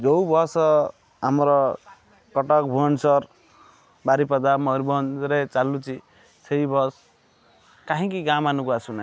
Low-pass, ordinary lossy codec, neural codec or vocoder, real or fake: none; none; none; real